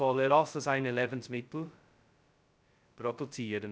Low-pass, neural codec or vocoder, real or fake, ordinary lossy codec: none; codec, 16 kHz, 0.2 kbps, FocalCodec; fake; none